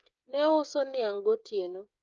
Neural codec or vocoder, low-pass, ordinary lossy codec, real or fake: codec, 16 kHz, 8 kbps, FreqCodec, smaller model; 7.2 kHz; Opus, 24 kbps; fake